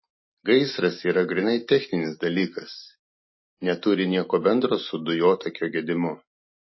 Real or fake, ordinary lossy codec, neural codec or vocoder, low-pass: real; MP3, 24 kbps; none; 7.2 kHz